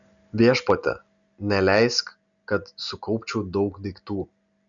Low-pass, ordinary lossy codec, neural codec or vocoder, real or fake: 7.2 kHz; MP3, 96 kbps; none; real